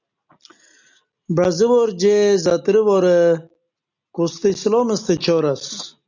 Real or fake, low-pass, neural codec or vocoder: real; 7.2 kHz; none